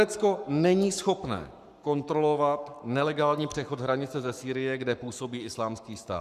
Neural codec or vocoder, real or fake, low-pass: codec, 44.1 kHz, 7.8 kbps, Pupu-Codec; fake; 14.4 kHz